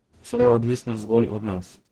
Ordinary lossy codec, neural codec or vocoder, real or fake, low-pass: Opus, 16 kbps; codec, 44.1 kHz, 0.9 kbps, DAC; fake; 19.8 kHz